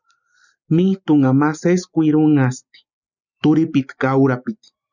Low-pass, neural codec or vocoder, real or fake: 7.2 kHz; none; real